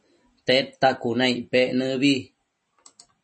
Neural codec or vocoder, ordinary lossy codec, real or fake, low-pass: none; MP3, 32 kbps; real; 10.8 kHz